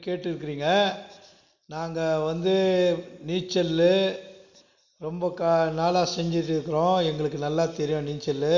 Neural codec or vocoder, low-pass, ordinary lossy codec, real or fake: none; 7.2 kHz; Opus, 64 kbps; real